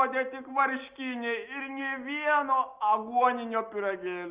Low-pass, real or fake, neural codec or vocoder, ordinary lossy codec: 3.6 kHz; real; none; Opus, 24 kbps